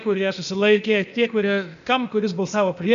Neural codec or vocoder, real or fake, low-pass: codec, 16 kHz, 0.8 kbps, ZipCodec; fake; 7.2 kHz